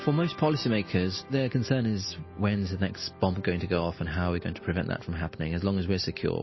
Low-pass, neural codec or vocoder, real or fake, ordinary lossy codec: 7.2 kHz; none; real; MP3, 24 kbps